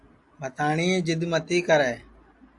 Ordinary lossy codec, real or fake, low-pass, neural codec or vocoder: AAC, 64 kbps; real; 10.8 kHz; none